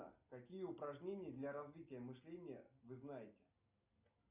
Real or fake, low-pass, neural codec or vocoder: real; 3.6 kHz; none